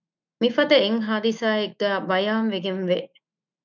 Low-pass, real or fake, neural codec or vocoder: 7.2 kHz; fake; autoencoder, 48 kHz, 128 numbers a frame, DAC-VAE, trained on Japanese speech